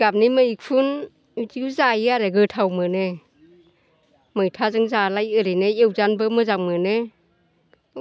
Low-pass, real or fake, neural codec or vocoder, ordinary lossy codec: none; real; none; none